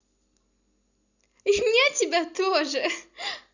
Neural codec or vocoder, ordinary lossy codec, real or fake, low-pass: none; none; real; 7.2 kHz